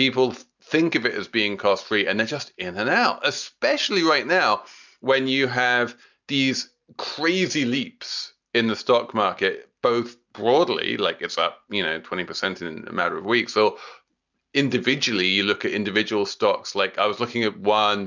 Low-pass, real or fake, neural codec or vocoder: 7.2 kHz; real; none